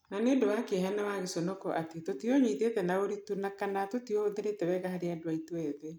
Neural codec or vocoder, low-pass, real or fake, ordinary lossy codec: none; none; real; none